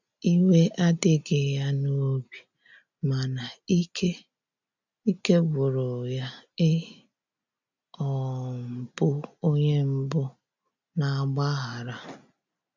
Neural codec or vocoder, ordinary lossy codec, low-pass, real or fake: none; none; 7.2 kHz; real